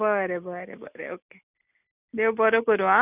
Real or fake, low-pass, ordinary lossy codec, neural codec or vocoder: real; 3.6 kHz; none; none